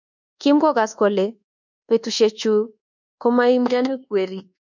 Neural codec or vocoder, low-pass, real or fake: codec, 24 kHz, 1.2 kbps, DualCodec; 7.2 kHz; fake